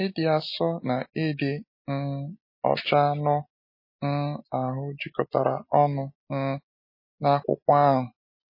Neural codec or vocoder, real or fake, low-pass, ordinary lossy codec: none; real; 5.4 kHz; MP3, 24 kbps